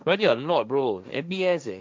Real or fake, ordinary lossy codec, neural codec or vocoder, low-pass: fake; none; codec, 16 kHz, 1.1 kbps, Voila-Tokenizer; none